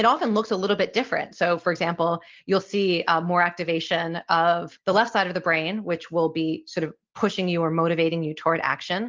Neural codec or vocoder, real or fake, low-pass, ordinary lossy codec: none; real; 7.2 kHz; Opus, 24 kbps